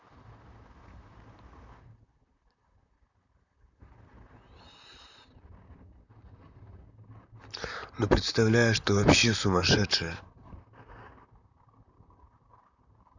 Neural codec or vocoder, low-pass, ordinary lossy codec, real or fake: vocoder, 44.1 kHz, 128 mel bands, Pupu-Vocoder; 7.2 kHz; none; fake